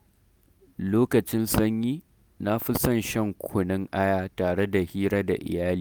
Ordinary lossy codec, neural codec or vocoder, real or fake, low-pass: none; none; real; none